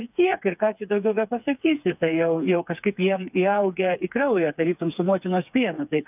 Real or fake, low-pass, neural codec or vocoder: fake; 3.6 kHz; codec, 16 kHz, 4 kbps, FreqCodec, smaller model